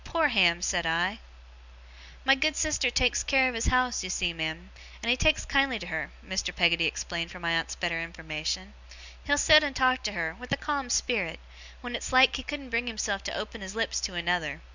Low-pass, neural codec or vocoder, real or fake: 7.2 kHz; none; real